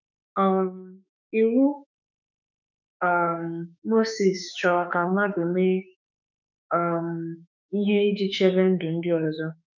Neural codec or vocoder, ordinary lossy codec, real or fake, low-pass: autoencoder, 48 kHz, 32 numbers a frame, DAC-VAE, trained on Japanese speech; none; fake; 7.2 kHz